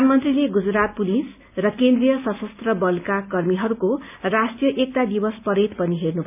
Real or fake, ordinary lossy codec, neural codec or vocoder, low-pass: real; none; none; 3.6 kHz